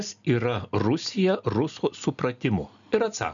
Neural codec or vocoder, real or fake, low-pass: none; real; 7.2 kHz